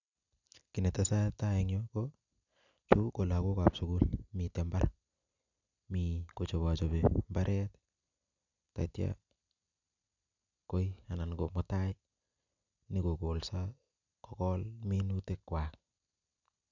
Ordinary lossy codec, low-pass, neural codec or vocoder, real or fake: none; 7.2 kHz; none; real